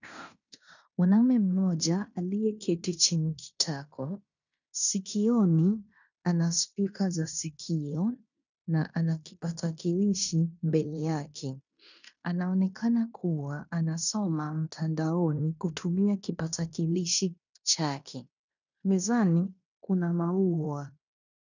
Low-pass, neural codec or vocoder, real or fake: 7.2 kHz; codec, 16 kHz in and 24 kHz out, 0.9 kbps, LongCat-Audio-Codec, fine tuned four codebook decoder; fake